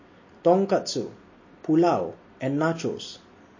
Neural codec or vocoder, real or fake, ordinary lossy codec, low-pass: none; real; MP3, 32 kbps; 7.2 kHz